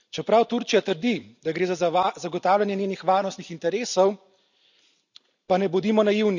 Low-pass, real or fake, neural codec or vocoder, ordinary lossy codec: 7.2 kHz; real; none; none